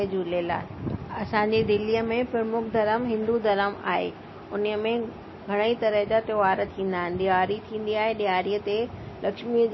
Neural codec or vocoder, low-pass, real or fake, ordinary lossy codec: none; 7.2 kHz; real; MP3, 24 kbps